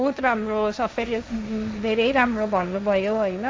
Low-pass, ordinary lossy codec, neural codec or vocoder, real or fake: none; none; codec, 16 kHz, 1.1 kbps, Voila-Tokenizer; fake